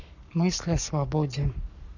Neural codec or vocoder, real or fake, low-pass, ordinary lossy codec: codec, 44.1 kHz, 7.8 kbps, Pupu-Codec; fake; 7.2 kHz; none